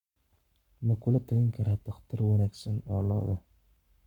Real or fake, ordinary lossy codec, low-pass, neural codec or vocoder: fake; none; 19.8 kHz; codec, 44.1 kHz, 7.8 kbps, Pupu-Codec